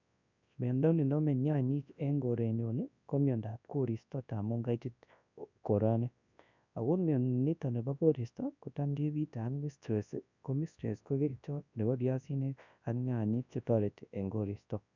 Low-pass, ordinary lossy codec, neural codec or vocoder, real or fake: 7.2 kHz; none; codec, 24 kHz, 0.9 kbps, WavTokenizer, large speech release; fake